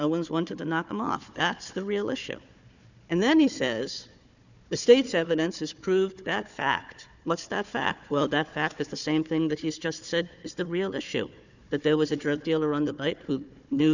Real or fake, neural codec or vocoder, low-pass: fake; codec, 16 kHz, 4 kbps, FunCodec, trained on Chinese and English, 50 frames a second; 7.2 kHz